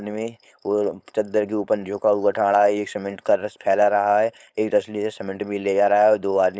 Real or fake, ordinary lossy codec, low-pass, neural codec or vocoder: fake; none; none; codec, 16 kHz, 4.8 kbps, FACodec